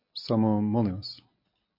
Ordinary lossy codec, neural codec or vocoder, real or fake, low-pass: MP3, 32 kbps; none; real; 5.4 kHz